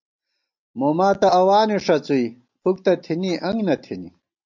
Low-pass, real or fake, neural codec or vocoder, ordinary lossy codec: 7.2 kHz; real; none; MP3, 64 kbps